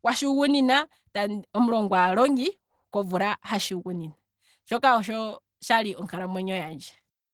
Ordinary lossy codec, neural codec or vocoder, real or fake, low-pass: Opus, 16 kbps; none; real; 19.8 kHz